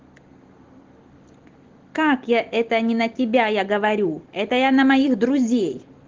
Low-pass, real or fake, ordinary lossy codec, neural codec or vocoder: 7.2 kHz; real; Opus, 16 kbps; none